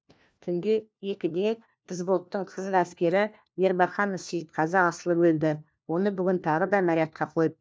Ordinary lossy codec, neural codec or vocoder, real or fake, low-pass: none; codec, 16 kHz, 1 kbps, FunCodec, trained on LibriTTS, 50 frames a second; fake; none